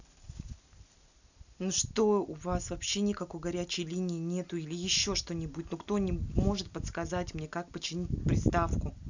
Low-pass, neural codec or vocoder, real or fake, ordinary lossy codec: 7.2 kHz; none; real; none